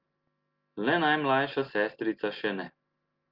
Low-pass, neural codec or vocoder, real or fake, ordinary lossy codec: 5.4 kHz; none; real; Opus, 24 kbps